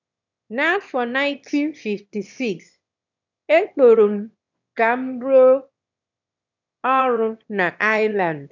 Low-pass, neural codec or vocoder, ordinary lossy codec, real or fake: 7.2 kHz; autoencoder, 22.05 kHz, a latent of 192 numbers a frame, VITS, trained on one speaker; none; fake